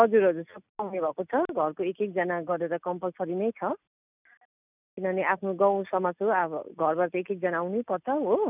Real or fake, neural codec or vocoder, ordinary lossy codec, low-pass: real; none; none; 3.6 kHz